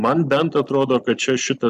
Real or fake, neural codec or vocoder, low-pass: real; none; 14.4 kHz